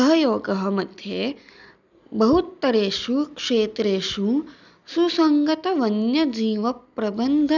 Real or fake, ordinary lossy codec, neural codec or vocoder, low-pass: real; none; none; 7.2 kHz